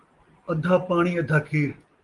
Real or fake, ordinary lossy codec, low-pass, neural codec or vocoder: real; Opus, 24 kbps; 10.8 kHz; none